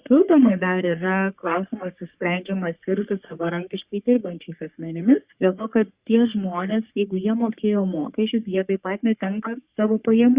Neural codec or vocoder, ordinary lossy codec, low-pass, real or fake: codec, 44.1 kHz, 3.4 kbps, Pupu-Codec; Opus, 64 kbps; 3.6 kHz; fake